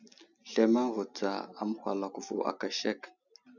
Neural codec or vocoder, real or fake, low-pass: none; real; 7.2 kHz